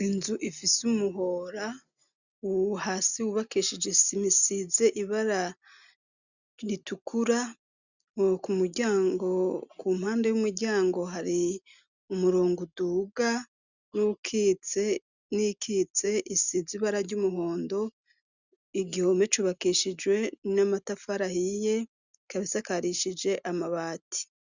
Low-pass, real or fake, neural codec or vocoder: 7.2 kHz; real; none